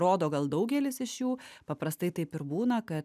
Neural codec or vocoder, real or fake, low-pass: none; real; 14.4 kHz